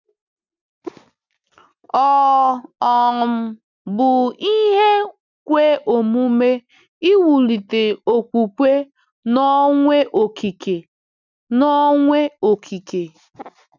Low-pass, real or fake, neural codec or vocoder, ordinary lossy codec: 7.2 kHz; real; none; none